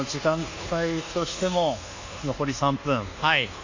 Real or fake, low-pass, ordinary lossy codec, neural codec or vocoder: fake; 7.2 kHz; AAC, 48 kbps; codec, 24 kHz, 1.2 kbps, DualCodec